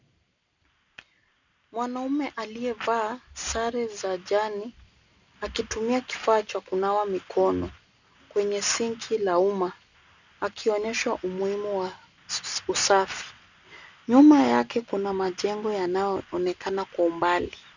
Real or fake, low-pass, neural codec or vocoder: real; 7.2 kHz; none